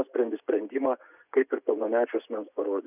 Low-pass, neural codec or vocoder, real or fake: 3.6 kHz; vocoder, 24 kHz, 100 mel bands, Vocos; fake